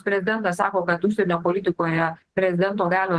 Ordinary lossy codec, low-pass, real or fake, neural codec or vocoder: Opus, 16 kbps; 10.8 kHz; fake; vocoder, 24 kHz, 100 mel bands, Vocos